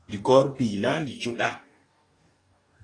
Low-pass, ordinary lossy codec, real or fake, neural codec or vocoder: 9.9 kHz; AAC, 32 kbps; fake; codec, 44.1 kHz, 2.6 kbps, DAC